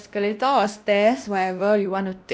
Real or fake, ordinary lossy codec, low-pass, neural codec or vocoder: fake; none; none; codec, 16 kHz, 1 kbps, X-Codec, WavLM features, trained on Multilingual LibriSpeech